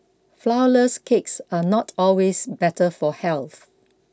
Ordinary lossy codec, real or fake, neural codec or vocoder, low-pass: none; real; none; none